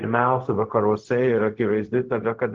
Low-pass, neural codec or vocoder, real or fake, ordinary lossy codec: 7.2 kHz; codec, 16 kHz, 0.4 kbps, LongCat-Audio-Codec; fake; Opus, 16 kbps